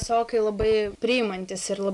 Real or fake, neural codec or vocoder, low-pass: real; none; 10.8 kHz